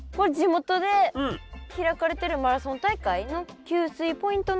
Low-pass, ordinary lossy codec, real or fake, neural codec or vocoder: none; none; real; none